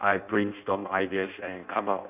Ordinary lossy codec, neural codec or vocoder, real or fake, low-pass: none; codec, 16 kHz in and 24 kHz out, 0.6 kbps, FireRedTTS-2 codec; fake; 3.6 kHz